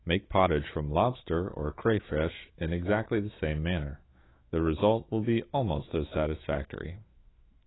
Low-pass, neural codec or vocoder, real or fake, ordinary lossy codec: 7.2 kHz; autoencoder, 48 kHz, 128 numbers a frame, DAC-VAE, trained on Japanese speech; fake; AAC, 16 kbps